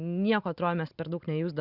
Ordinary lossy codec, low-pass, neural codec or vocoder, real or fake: Opus, 64 kbps; 5.4 kHz; none; real